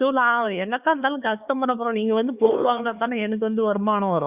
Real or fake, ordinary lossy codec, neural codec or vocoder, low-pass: fake; Opus, 64 kbps; codec, 16 kHz, 4 kbps, X-Codec, HuBERT features, trained on LibriSpeech; 3.6 kHz